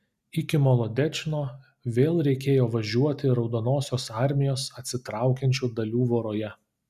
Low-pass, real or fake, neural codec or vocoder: 14.4 kHz; real; none